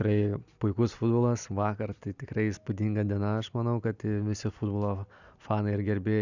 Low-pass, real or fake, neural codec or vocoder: 7.2 kHz; real; none